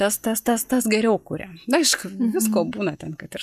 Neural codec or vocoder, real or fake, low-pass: none; real; 14.4 kHz